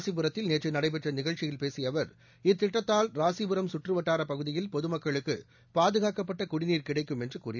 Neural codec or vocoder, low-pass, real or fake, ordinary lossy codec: none; 7.2 kHz; real; none